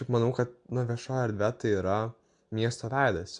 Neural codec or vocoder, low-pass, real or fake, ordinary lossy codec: none; 9.9 kHz; real; MP3, 64 kbps